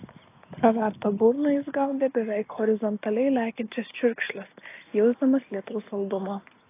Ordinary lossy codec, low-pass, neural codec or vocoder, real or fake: AAC, 24 kbps; 3.6 kHz; none; real